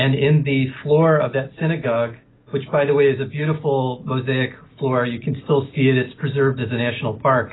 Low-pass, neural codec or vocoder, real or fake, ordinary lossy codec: 7.2 kHz; none; real; AAC, 16 kbps